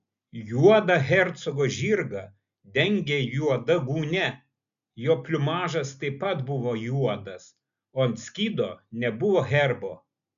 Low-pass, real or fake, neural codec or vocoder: 7.2 kHz; real; none